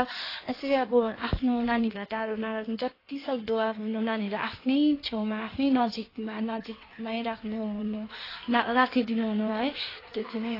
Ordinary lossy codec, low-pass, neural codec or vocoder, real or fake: AAC, 24 kbps; 5.4 kHz; codec, 16 kHz in and 24 kHz out, 1.1 kbps, FireRedTTS-2 codec; fake